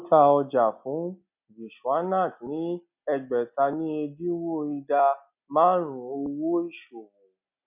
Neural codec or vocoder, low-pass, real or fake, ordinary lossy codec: none; 3.6 kHz; real; none